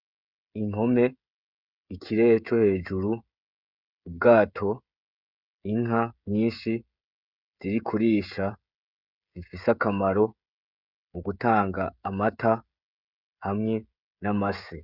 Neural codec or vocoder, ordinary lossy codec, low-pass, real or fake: codec, 16 kHz, 16 kbps, FreqCodec, smaller model; Opus, 64 kbps; 5.4 kHz; fake